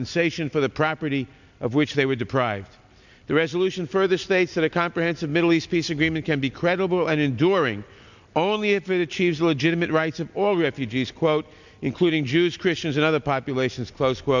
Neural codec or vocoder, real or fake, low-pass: none; real; 7.2 kHz